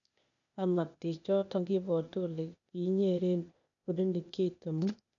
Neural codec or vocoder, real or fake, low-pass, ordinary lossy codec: codec, 16 kHz, 0.8 kbps, ZipCodec; fake; 7.2 kHz; none